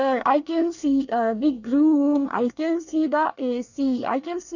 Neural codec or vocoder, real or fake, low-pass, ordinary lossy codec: codec, 24 kHz, 1 kbps, SNAC; fake; 7.2 kHz; none